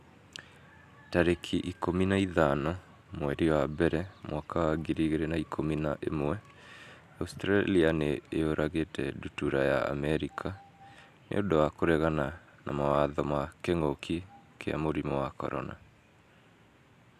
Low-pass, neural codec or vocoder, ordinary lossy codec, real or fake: 14.4 kHz; none; AAC, 96 kbps; real